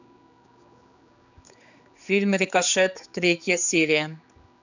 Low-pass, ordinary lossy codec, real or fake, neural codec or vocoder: 7.2 kHz; none; fake; codec, 16 kHz, 4 kbps, X-Codec, HuBERT features, trained on general audio